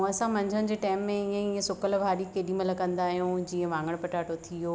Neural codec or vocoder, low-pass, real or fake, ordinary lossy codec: none; none; real; none